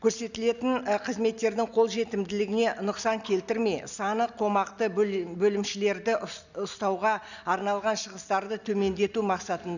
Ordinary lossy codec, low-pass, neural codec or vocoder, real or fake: none; 7.2 kHz; none; real